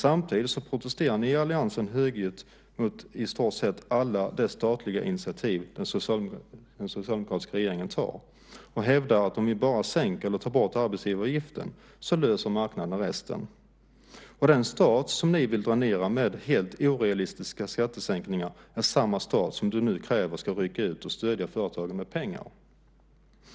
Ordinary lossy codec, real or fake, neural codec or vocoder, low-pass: none; real; none; none